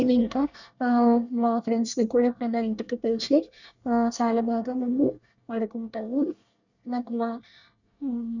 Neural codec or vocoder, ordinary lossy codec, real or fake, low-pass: codec, 24 kHz, 1 kbps, SNAC; none; fake; 7.2 kHz